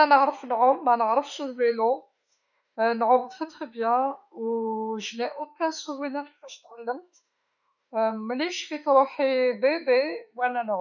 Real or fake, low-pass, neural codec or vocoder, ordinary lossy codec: fake; 7.2 kHz; autoencoder, 48 kHz, 32 numbers a frame, DAC-VAE, trained on Japanese speech; none